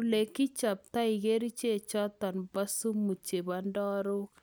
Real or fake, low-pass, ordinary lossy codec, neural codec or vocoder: real; none; none; none